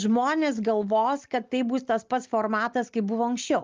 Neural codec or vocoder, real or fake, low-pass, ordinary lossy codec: none; real; 7.2 kHz; Opus, 32 kbps